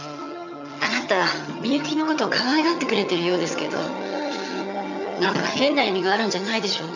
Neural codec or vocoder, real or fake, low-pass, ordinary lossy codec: vocoder, 22.05 kHz, 80 mel bands, HiFi-GAN; fake; 7.2 kHz; none